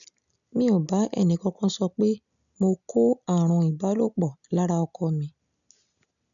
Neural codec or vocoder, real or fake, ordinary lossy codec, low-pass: none; real; none; 7.2 kHz